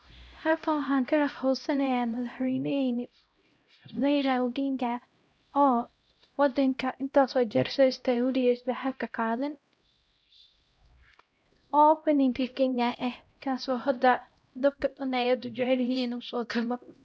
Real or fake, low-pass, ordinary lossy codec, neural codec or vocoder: fake; none; none; codec, 16 kHz, 0.5 kbps, X-Codec, HuBERT features, trained on LibriSpeech